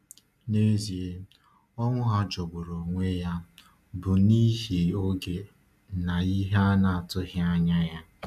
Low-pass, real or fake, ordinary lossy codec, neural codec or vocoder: 14.4 kHz; real; AAC, 96 kbps; none